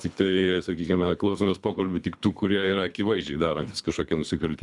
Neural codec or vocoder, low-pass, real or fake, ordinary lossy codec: codec, 24 kHz, 3 kbps, HILCodec; 10.8 kHz; fake; MP3, 96 kbps